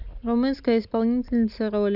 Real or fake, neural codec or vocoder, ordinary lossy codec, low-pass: real; none; none; 5.4 kHz